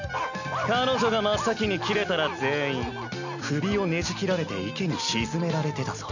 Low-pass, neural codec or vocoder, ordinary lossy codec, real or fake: 7.2 kHz; none; none; real